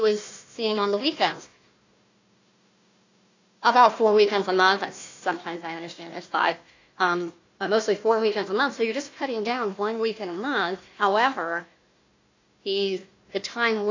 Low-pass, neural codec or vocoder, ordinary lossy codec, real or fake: 7.2 kHz; codec, 16 kHz, 1 kbps, FunCodec, trained on Chinese and English, 50 frames a second; AAC, 48 kbps; fake